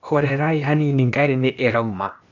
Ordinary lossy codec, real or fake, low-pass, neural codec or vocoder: none; fake; 7.2 kHz; codec, 16 kHz, 0.8 kbps, ZipCodec